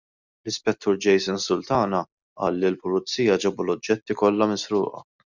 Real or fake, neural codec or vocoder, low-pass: real; none; 7.2 kHz